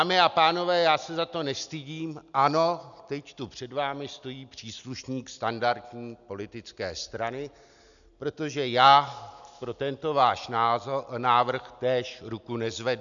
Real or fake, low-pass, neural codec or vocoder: real; 7.2 kHz; none